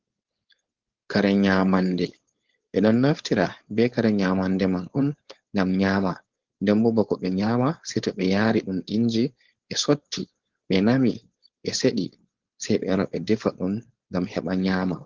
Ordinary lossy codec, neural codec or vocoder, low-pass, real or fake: Opus, 16 kbps; codec, 16 kHz, 4.8 kbps, FACodec; 7.2 kHz; fake